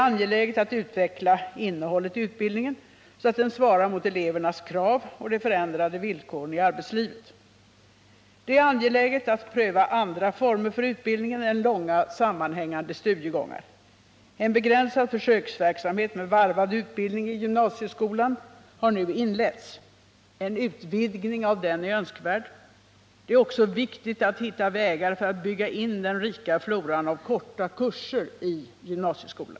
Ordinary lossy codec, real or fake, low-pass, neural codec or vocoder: none; real; none; none